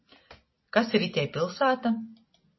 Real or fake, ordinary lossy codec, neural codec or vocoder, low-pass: real; MP3, 24 kbps; none; 7.2 kHz